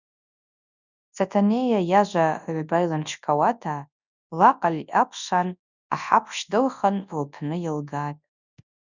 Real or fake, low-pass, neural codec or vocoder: fake; 7.2 kHz; codec, 24 kHz, 0.9 kbps, WavTokenizer, large speech release